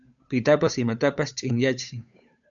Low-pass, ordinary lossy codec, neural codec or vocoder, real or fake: 7.2 kHz; MP3, 96 kbps; codec, 16 kHz, 8 kbps, FunCodec, trained on Chinese and English, 25 frames a second; fake